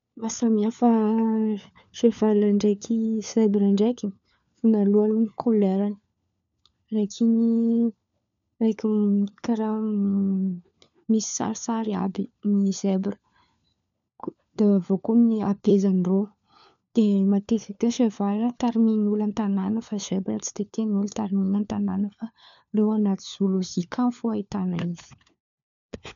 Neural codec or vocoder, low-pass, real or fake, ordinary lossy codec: codec, 16 kHz, 4 kbps, FunCodec, trained on LibriTTS, 50 frames a second; 7.2 kHz; fake; none